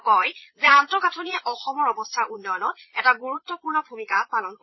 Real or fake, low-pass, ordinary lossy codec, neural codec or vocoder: fake; 7.2 kHz; MP3, 24 kbps; vocoder, 22.05 kHz, 80 mel bands, Vocos